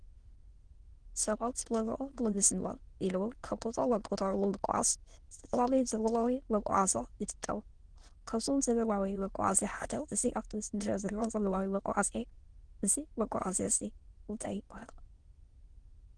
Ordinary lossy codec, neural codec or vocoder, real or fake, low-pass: Opus, 16 kbps; autoencoder, 22.05 kHz, a latent of 192 numbers a frame, VITS, trained on many speakers; fake; 9.9 kHz